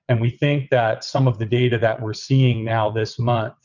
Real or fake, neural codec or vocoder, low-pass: fake; vocoder, 22.05 kHz, 80 mel bands, WaveNeXt; 7.2 kHz